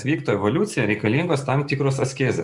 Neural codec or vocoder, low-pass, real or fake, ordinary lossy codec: none; 10.8 kHz; real; Opus, 64 kbps